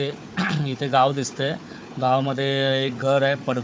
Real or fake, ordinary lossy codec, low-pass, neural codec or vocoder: fake; none; none; codec, 16 kHz, 4 kbps, FunCodec, trained on Chinese and English, 50 frames a second